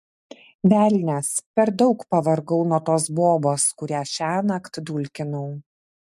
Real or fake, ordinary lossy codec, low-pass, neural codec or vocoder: real; MP3, 64 kbps; 14.4 kHz; none